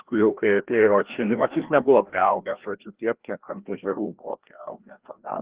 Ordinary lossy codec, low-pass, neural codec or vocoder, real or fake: Opus, 16 kbps; 3.6 kHz; codec, 16 kHz, 1 kbps, FreqCodec, larger model; fake